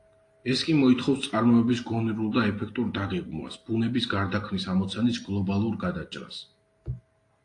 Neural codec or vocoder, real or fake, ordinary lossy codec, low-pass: none; real; AAC, 48 kbps; 10.8 kHz